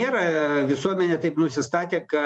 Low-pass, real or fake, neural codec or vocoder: 10.8 kHz; fake; vocoder, 24 kHz, 100 mel bands, Vocos